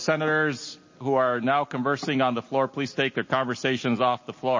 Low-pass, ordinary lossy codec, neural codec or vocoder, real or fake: 7.2 kHz; MP3, 32 kbps; none; real